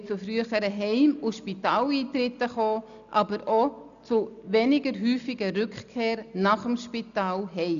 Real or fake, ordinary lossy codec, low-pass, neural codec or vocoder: real; MP3, 96 kbps; 7.2 kHz; none